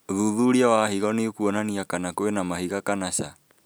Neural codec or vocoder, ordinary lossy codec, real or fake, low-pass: none; none; real; none